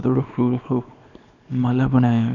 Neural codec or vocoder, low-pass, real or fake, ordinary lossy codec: codec, 24 kHz, 0.9 kbps, WavTokenizer, small release; 7.2 kHz; fake; none